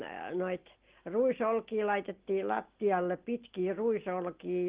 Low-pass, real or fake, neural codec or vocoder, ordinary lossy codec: 3.6 kHz; real; none; Opus, 16 kbps